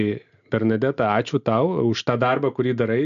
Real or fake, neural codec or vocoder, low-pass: real; none; 7.2 kHz